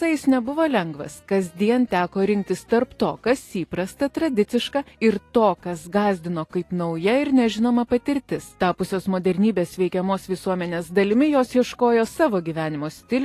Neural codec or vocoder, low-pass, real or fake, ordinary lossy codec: none; 14.4 kHz; real; AAC, 48 kbps